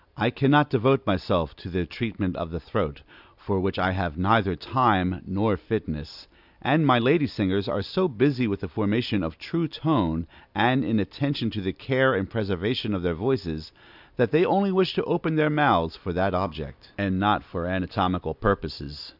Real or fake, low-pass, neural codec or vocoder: real; 5.4 kHz; none